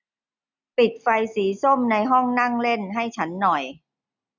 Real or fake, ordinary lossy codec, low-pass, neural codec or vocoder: real; none; 7.2 kHz; none